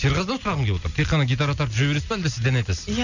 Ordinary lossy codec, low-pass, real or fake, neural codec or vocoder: none; 7.2 kHz; real; none